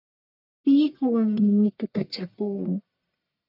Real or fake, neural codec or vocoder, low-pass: fake; codec, 44.1 kHz, 1.7 kbps, Pupu-Codec; 5.4 kHz